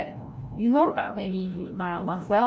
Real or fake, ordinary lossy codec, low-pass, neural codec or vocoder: fake; none; none; codec, 16 kHz, 0.5 kbps, FreqCodec, larger model